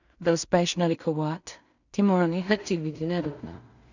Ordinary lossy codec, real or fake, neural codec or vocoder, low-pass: none; fake; codec, 16 kHz in and 24 kHz out, 0.4 kbps, LongCat-Audio-Codec, two codebook decoder; 7.2 kHz